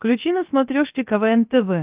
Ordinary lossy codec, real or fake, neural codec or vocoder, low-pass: Opus, 24 kbps; fake; codec, 16 kHz, 0.7 kbps, FocalCodec; 3.6 kHz